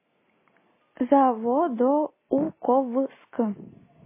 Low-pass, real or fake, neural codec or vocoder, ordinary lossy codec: 3.6 kHz; real; none; MP3, 16 kbps